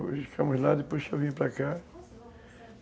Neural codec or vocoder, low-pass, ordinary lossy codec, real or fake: none; none; none; real